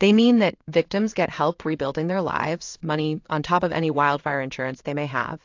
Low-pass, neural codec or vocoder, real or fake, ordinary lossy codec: 7.2 kHz; codec, 16 kHz in and 24 kHz out, 1 kbps, XY-Tokenizer; fake; AAC, 48 kbps